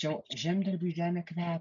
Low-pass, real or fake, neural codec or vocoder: 7.2 kHz; real; none